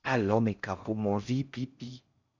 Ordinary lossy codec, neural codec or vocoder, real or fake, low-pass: Opus, 64 kbps; codec, 16 kHz in and 24 kHz out, 0.6 kbps, FocalCodec, streaming, 4096 codes; fake; 7.2 kHz